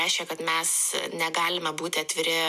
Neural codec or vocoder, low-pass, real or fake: none; 14.4 kHz; real